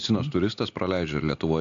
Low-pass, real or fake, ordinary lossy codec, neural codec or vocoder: 7.2 kHz; real; AAC, 64 kbps; none